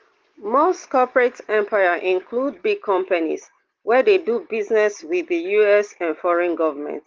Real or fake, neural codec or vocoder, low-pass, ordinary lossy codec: real; none; 7.2 kHz; Opus, 16 kbps